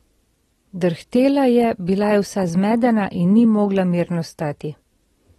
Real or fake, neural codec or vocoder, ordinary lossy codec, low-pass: real; none; AAC, 32 kbps; 19.8 kHz